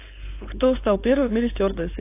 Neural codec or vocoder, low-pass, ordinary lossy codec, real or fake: codec, 16 kHz, 4.8 kbps, FACodec; 3.6 kHz; AAC, 24 kbps; fake